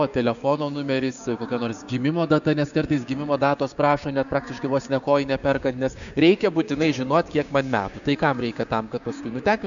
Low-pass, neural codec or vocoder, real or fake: 7.2 kHz; codec, 16 kHz, 6 kbps, DAC; fake